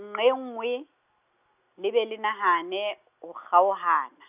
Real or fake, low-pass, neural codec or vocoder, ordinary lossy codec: real; 3.6 kHz; none; none